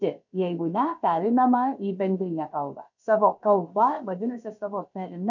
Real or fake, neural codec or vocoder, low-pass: fake; codec, 16 kHz, about 1 kbps, DyCAST, with the encoder's durations; 7.2 kHz